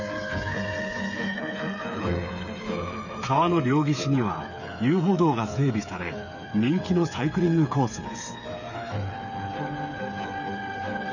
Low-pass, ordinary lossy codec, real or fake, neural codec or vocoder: 7.2 kHz; none; fake; codec, 16 kHz, 8 kbps, FreqCodec, smaller model